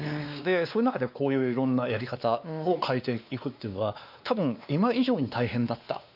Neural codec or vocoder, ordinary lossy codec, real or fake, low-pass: codec, 16 kHz, 2 kbps, X-Codec, WavLM features, trained on Multilingual LibriSpeech; none; fake; 5.4 kHz